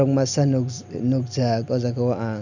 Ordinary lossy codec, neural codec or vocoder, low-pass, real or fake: none; none; 7.2 kHz; real